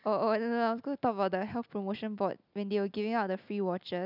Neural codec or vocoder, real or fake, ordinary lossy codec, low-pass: none; real; none; 5.4 kHz